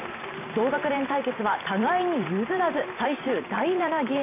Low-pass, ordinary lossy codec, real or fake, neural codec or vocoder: 3.6 kHz; none; fake; vocoder, 44.1 kHz, 128 mel bands every 512 samples, BigVGAN v2